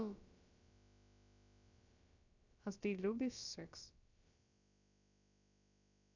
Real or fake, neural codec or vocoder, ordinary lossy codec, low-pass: fake; codec, 16 kHz, about 1 kbps, DyCAST, with the encoder's durations; none; 7.2 kHz